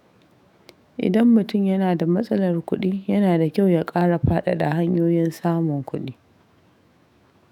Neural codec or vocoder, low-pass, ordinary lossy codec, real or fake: autoencoder, 48 kHz, 128 numbers a frame, DAC-VAE, trained on Japanese speech; 19.8 kHz; none; fake